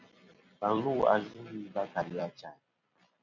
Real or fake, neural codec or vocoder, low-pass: fake; vocoder, 44.1 kHz, 128 mel bands every 256 samples, BigVGAN v2; 7.2 kHz